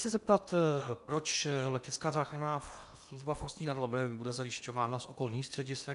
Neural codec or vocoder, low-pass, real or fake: codec, 16 kHz in and 24 kHz out, 0.8 kbps, FocalCodec, streaming, 65536 codes; 10.8 kHz; fake